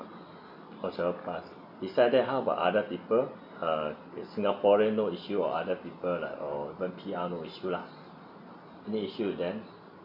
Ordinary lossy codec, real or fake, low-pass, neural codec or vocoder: none; real; 5.4 kHz; none